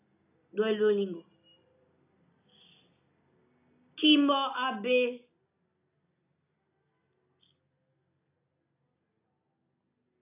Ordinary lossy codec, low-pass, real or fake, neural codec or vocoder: AAC, 24 kbps; 3.6 kHz; real; none